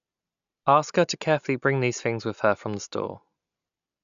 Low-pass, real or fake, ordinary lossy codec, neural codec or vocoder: 7.2 kHz; real; none; none